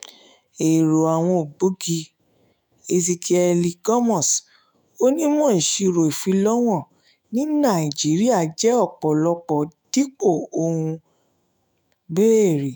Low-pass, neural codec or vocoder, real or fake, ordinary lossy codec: none; autoencoder, 48 kHz, 128 numbers a frame, DAC-VAE, trained on Japanese speech; fake; none